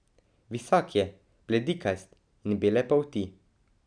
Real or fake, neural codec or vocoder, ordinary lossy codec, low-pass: real; none; none; 9.9 kHz